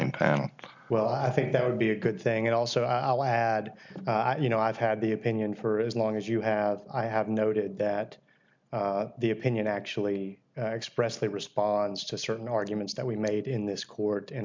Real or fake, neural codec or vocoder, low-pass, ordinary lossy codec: real; none; 7.2 kHz; MP3, 64 kbps